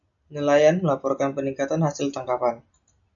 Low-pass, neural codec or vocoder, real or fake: 7.2 kHz; none; real